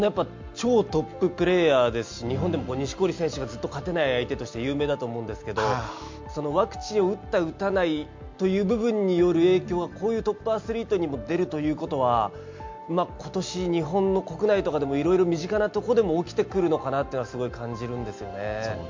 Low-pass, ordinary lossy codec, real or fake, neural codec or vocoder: 7.2 kHz; MP3, 48 kbps; real; none